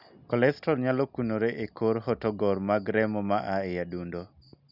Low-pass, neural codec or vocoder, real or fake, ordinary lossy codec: 5.4 kHz; none; real; none